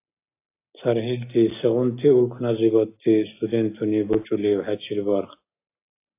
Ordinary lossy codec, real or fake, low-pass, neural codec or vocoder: AAC, 24 kbps; real; 3.6 kHz; none